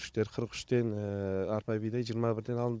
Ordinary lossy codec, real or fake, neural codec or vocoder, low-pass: none; fake; codec, 16 kHz, 16 kbps, FunCodec, trained on Chinese and English, 50 frames a second; none